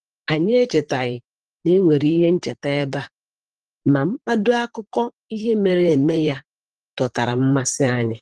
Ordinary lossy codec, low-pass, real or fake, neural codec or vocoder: Opus, 16 kbps; 10.8 kHz; fake; vocoder, 44.1 kHz, 128 mel bands, Pupu-Vocoder